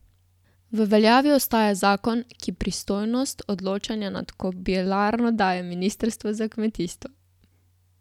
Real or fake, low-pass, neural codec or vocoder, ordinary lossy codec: real; 19.8 kHz; none; none